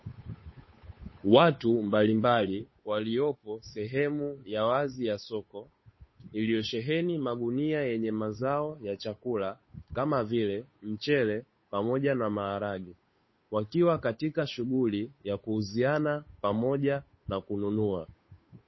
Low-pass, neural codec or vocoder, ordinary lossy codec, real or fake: 7.2 kHz; codec, 16 kHz, 8 kbps, FunCodec, trained on Chinese and English, 25 frames a second; MP3, 24 kbps; fake